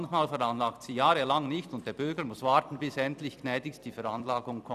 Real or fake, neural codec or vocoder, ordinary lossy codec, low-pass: fake; vocoder, 44.1 kHz, 128 mel bands every 256 samples, BigVGAN v2; none; 14.4 kHz